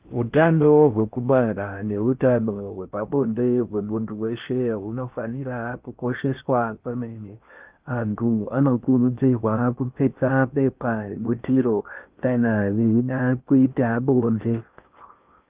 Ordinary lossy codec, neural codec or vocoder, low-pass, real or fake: Opus, 24 kbps; codec, 16 kHz in and 24 kHz out, 0.6 kbps, FocalCodec, streaming, 4096 codes; 3.6 kHz; fake